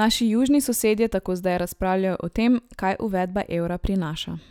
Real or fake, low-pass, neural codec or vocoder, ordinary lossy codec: real; 19.8 kHz; none; none